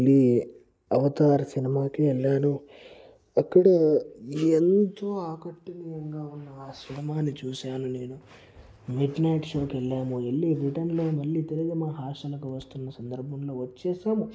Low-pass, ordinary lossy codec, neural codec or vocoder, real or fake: none; none; none; real